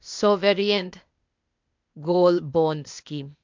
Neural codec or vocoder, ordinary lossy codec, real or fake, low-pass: codec, 16 kHz, 0.8 kbps, ZipCodec; MP3, 64 kbps; fake; 7.2 kHz